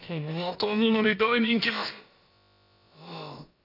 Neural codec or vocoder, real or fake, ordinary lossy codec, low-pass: codec, 16 kHz, about 1 kbps, DyCAST, with the encoder's durations; fake; none; 5.4 kHz